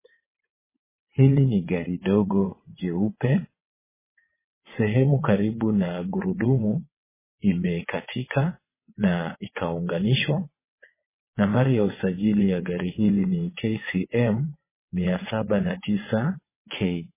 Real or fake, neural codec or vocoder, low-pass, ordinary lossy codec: fake; vocoder, 22.05 kHz, 80 mel bands, WaveNeXt; 3.6 kHz; MP3, 16 kbps